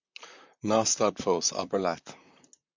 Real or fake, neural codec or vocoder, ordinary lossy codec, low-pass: fake; vocoder, 44.1 kHz, 128 mel bands, Pupu-Vocoder; MP3, 64 kbps; 7.2 kHz